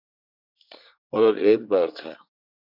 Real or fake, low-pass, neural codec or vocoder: fake; 5.4 kHz; codec, 24 kHz, 1 kbps, SNAC